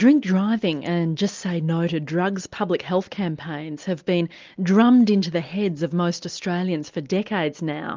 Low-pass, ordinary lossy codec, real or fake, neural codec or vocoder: 7.2 kHz; Opus, 24 kbps; real; none